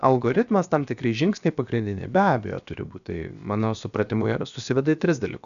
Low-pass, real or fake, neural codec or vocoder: 7.2 kHz; fake; codec, 16 kHz, about 1 kbps, DyCAST, with the encoder's durations